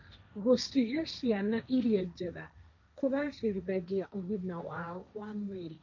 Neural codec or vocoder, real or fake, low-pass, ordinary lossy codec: codec, 16 kHz, 1.1 kbps, Voila-Tokenizer; fake; 7.2 kHz; none